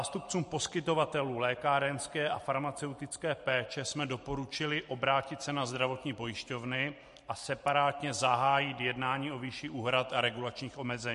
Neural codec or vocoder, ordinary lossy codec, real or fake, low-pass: vocoder, 44.1 kHz, 128 mel bands every 512 samples, BigVGAN v2; MP3, 48 kbps; fake; 14.4 kHz